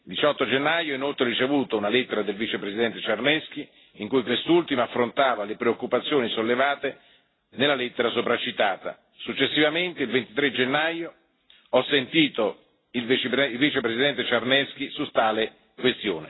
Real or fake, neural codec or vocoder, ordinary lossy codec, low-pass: real; none; AAC, 16 kbps; 7.2 kHz